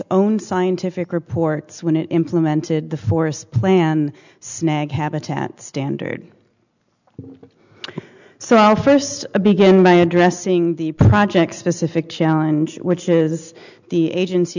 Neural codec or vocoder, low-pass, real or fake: none; 7.2 kHz; real